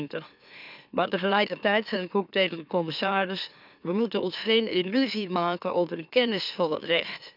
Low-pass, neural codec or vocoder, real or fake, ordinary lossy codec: 5.4 kHz; autoencoder, 44.1 kHz, a latent of 192 numbers a frame, MeloTTS; fake; none